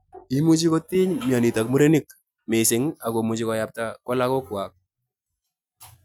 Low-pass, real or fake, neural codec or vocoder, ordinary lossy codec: 19.8 kHz; real; none; none